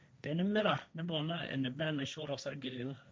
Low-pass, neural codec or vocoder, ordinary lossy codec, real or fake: none; codec, 16 kHz, 1.1 kbps, Voila-Tokenizer; none; fake